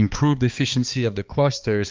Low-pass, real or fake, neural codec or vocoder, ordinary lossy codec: 7.2 kHz; fake; codec, 16 kHz, 2 kbps, X-Codec, HuBERT features, trained on balanced general audio; Opus, 24 kbps